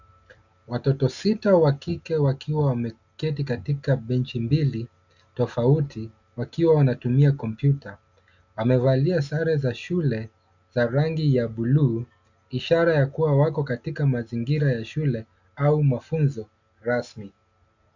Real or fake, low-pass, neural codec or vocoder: real; 7.2 kHz; none